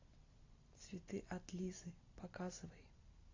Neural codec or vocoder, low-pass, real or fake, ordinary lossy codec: none; 7.2 kHz; real; AAC, 48 kbps